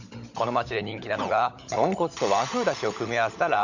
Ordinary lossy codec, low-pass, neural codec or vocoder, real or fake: none; 7.2 kHz; codec, 16 kHz, 16 kbps, FunCodec, trained on LibriTTS, 50 frames a second; fake